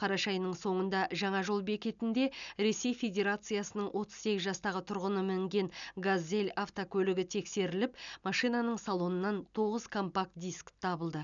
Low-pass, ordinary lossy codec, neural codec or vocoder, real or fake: 7.2 kHz; none; none; real